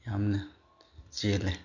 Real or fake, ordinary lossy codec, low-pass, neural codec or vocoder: real; none; 7.2 kHz; none